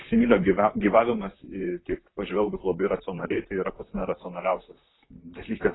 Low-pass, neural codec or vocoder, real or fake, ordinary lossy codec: 7.2 kHz; vocoder, 44.1 kHz, 128 mel bands, Pupu-Vocoder; fake; AAC, 16 kbps